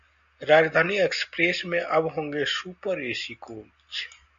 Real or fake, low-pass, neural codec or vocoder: real; 7.2 kHz; none